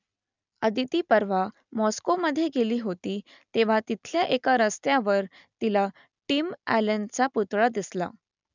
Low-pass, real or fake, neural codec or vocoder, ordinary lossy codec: 7.2 kHz; real; none; none